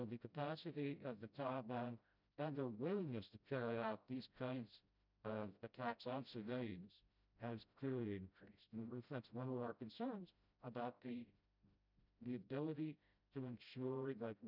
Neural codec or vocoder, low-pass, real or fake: codec, 16 kHz, 0.5 kbps, FreqCodec, smaller model; 5.4 kHz; fake